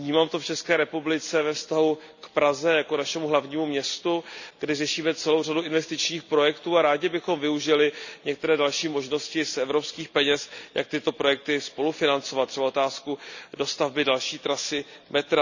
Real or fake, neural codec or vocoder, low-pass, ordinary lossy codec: real; none; 7.2 kHz; none